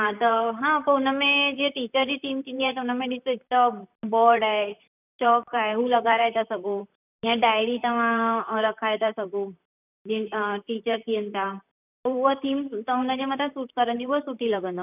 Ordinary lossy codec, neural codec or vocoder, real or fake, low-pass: none; vocoder, 44.1 kHz, 128 mel bands, Pupu-Vocoder; fake; 3.6 kHz